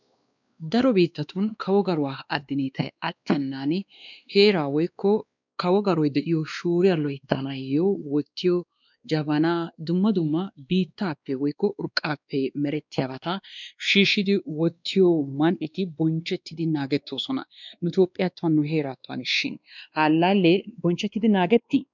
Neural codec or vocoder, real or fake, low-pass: codec, 16 kHz, 2 kbps, X-Codec, WavLM features, trained on Multilingual LibriSpeech; fake; 7.2 kHz